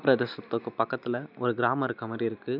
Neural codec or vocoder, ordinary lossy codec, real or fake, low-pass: none; none; real; 5.4 kHz